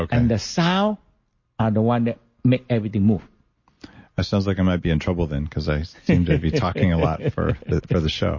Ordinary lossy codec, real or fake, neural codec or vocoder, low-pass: MP3, 32 kbps; real; none; 7.2 kHz